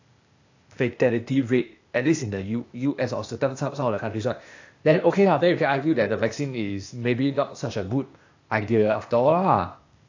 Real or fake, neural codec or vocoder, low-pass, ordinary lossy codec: fake; codec, 16 kHz, 0.8 kbps, ZipCodec; 7.2 kHz; AAC, 48 kbps